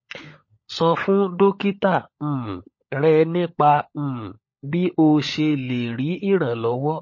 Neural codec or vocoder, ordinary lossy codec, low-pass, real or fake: codec, 16 kHz, 16 kbps, FunCodec, trained on LibriTTS, 50 frames a second; MP3, 32 kbps; 7.2 kHz; fake